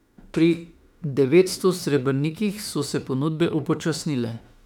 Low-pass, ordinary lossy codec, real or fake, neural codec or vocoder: 19.8 kHz; none; fake; autoencoder, 48 kHz, 32 numbers a frame, DAC-VAE, trained on Japanese speech